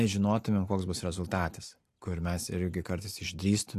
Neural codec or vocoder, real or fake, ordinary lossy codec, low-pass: none; real; AAC, 64 kbps; 14.4 kHz